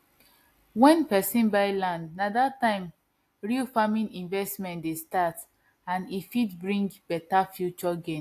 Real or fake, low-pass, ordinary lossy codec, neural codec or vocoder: real; 14.4 kHz; AAC, 64 kbps; none